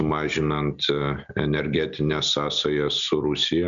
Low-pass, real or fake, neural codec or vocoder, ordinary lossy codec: 7.2 kHz; real; none; MP3, 96 kbps